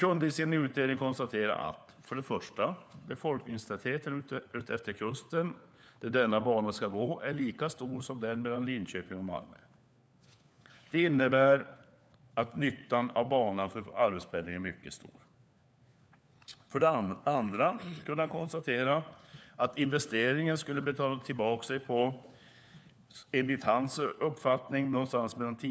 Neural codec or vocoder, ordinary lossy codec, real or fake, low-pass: codec, 16 kHz, 4 kbps, FunCodec, trained on LibriTTS, 50 frames a second; none; fake; none